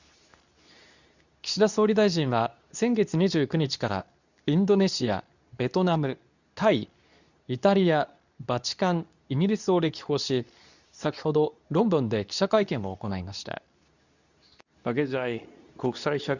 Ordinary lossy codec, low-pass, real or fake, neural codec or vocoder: none; 7.2 kHz; fake; codec, 24 kHz, 0.9 kbps, WavTokenizer, medium speech release version 2